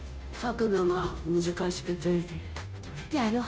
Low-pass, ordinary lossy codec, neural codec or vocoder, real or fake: none; none; codec, 16 kHz, 0.5 kbps, FunCodec, trained on Chinese and English, 25 frames a second; fake